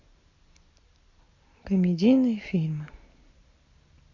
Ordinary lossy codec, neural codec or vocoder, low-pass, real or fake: AAC, 32 kbps; none; 7.2 kHz; real